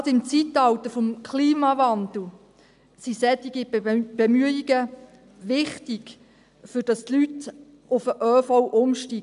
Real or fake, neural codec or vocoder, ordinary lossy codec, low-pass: real; none; none; 10.8 kHz